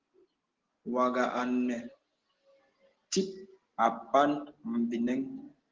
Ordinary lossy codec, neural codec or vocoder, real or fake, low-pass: Opus, 16 kbps; none; real; 7.2 kHz